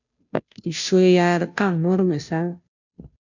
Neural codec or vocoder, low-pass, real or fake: codec, 16 kHz, 0.5 kbps, FunCodec, trained on Chinese and English, 25 frames a second; 7.2 kHz; fake